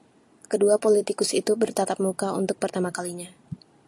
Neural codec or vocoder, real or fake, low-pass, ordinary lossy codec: none; real; 10.8 kHz; AAC, 64 kbps